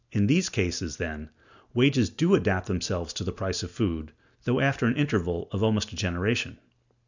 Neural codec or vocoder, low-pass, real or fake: none; 7.2 kHz; real